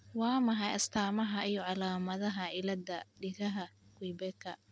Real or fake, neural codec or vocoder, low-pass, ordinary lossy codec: real; none; none; none